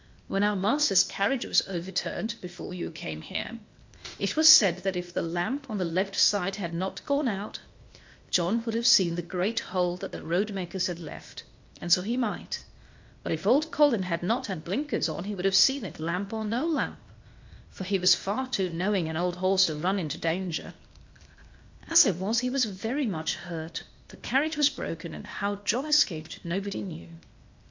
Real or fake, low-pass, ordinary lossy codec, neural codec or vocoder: fake; 7.2 kHz; MP3, 48 kbps; codec, 16 kHz, 0.8 kbps, ZipCodec